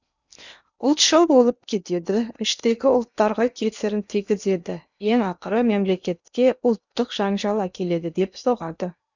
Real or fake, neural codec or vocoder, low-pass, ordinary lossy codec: fake; codec, 16 kHz in and 24 kHz out, 0.8 kbps, FocalCodec, streaming, 65536 codes; 7.2 kHz; none